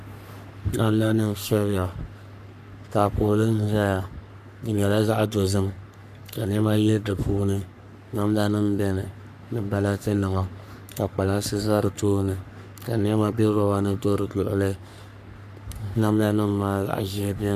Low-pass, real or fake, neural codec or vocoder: 14.4 kHz; fake; codec, 44.1 kHz, 3.4 kbps, Pupu-Codec